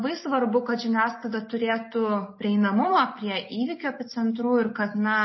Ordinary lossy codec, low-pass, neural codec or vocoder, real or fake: MP3, 24 kbps; 7.2 kHz; none; real